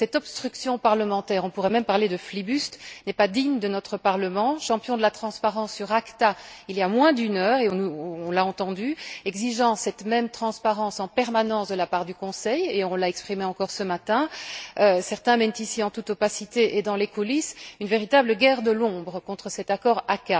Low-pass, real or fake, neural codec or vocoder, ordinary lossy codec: none; real; none; none